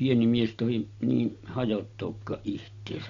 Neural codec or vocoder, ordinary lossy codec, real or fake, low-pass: none; MP3, 48 kbps; real; 7.2 kHz